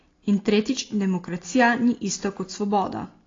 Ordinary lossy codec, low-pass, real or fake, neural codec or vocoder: AAC, 32 kbps; 7.2 kHz; real; none